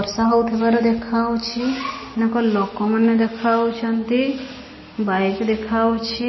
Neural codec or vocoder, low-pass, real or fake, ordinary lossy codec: none; 7.2 kHz; real; MP3, 24 kbps